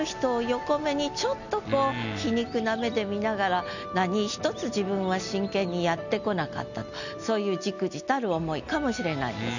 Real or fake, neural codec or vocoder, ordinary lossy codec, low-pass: real; none; none; 7.2 kHz